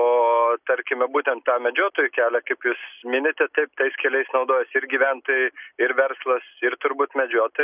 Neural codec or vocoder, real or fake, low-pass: none; real; 3.6 kHz